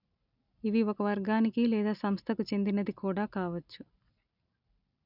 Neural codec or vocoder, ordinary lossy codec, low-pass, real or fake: none; none; 5.4 kHz; real